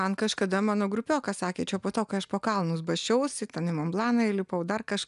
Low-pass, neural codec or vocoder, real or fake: 10.8 kHz; none; real